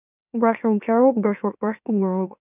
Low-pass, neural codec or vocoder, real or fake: 3.6 kHz; autoencoder, 44.1 kHz, a latent of 192 numbers a frame, MeloTTS; fake